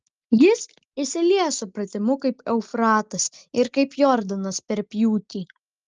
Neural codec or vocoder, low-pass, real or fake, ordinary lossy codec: none; 7.2 kHz; real; Opus, 24 kbps